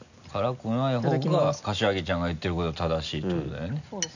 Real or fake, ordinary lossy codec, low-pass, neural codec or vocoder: real; none; 7.2 kHz; none